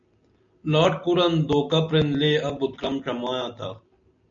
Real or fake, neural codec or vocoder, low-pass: real; none; 7.2 kHz